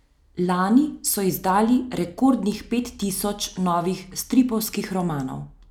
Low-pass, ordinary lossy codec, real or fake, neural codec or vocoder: 19.8 kHz; none; real; none